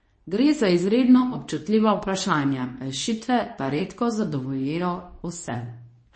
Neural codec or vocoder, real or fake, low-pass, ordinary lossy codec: codec, 24 kHz, 0.9 kbps, WavTokenizer, medium speech release version 2; fake; 9.9 kHz; MP3, 32 kbps